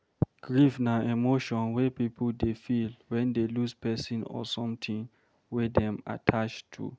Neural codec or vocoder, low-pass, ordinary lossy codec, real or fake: none; none; none; real